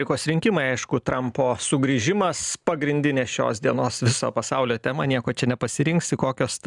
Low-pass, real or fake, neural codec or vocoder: 10.8 kHz; real; none